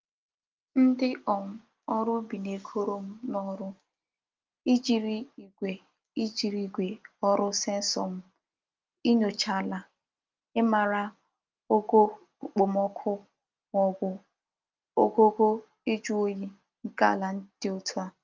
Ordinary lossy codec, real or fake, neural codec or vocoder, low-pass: Opus, 24 kbps; real; none; 7.2 kHz